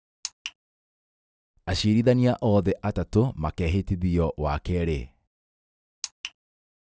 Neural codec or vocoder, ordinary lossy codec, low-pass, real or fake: none; none; none; real